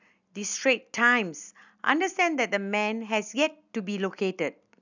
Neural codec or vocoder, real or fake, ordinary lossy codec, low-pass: none; real; none; 7.2 kHz